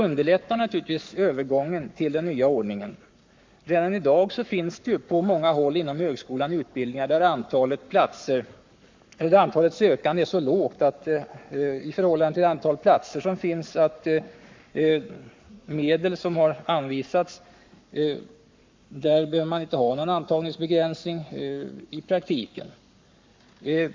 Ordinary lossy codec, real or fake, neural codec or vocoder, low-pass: MP3, 64 kbps; fake; codec, 44.1 kHz, 7.8 kbps, Pupu-Codec; 7.2 kHz